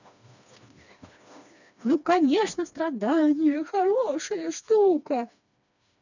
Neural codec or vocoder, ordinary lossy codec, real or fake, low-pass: codec, 16 kHz, 2 kbps, FreqCodec, smaller model; none; fake; 7.2 kHz